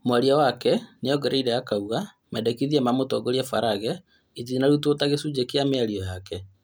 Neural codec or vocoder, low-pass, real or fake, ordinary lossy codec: none; none; real; none